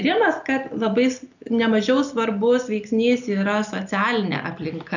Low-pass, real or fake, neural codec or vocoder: 7.2 kHz; real; none